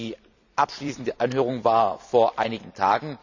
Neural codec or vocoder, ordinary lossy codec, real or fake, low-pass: vocoder, 44.1 kHz, 128 mel bands every 256 samples, BigVGAN v2; none; fake; 7.2 kHz